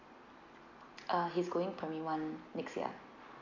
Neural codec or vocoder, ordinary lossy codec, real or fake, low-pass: none; none; real; 7.2 kHz